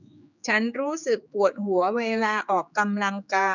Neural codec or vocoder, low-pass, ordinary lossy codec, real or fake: codec, 16 kHz, 4 kbps, X-Codec, HuBERT features, trained on general audio; 7.2 kHz; none; fake